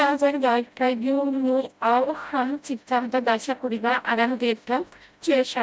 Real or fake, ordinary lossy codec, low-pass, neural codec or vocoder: fake; none; none; codec, 16 kHz, 0.5 kbps, FreqCodec, smaller model